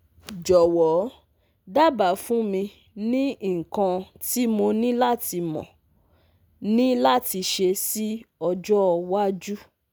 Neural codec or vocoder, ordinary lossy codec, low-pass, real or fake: none; none; none; real